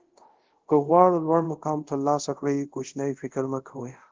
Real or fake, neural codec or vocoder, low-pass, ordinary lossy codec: fake; codec, 24 kHz, 0.5 kbps, DualCodec; 7.2 kHz; Opus, 16 kbps